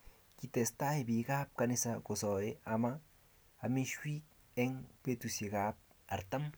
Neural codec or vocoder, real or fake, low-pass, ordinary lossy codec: none; real; none; none